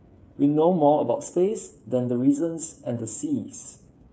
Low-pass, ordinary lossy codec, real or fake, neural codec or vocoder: none; none; fake; codec, 16 kHz, 8 kbps, FreqCodec, smaller model